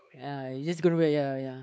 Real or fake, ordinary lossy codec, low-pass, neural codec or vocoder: fake; none; none; codec, 16 kHz, 4 kbps, X-Codec, WavLM features, trained on Multilingual LibriSpeech